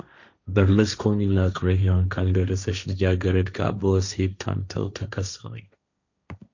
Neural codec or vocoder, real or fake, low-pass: codec, 16 kHz, 1.1 kbps, Voila-Tokenizer; fake; 7.2 kHz